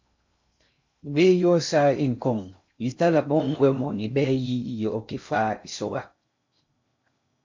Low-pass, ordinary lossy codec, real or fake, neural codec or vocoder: 7.2 kHz; MP3, 48 kbps; fake; codec, 16 kHz in and 24 kHz out, 0.6 kbps, FocalCodec, streaming, 2048 codes